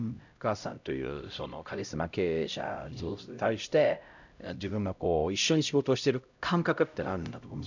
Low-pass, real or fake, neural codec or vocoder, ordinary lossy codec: 7.2 kHz; fake; codec, 16 kHz, 0.5 kbps, X-Codec, HuBERT features, trained on LibriSpeech; none